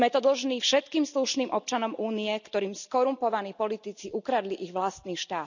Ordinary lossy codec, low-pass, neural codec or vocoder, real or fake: none; 7.2 kHz; none; real